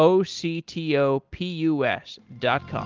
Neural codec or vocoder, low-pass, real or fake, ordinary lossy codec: none; 7.2 kHz; real; Opus, 32 kbps